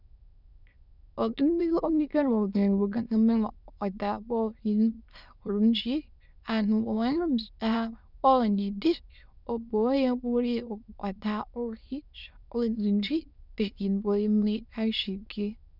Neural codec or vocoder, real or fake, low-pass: autoencoder, 22.05 kHz, a latent of 192 numbers a frame, VITS, trained on many speakers; fake; 5.4 kHz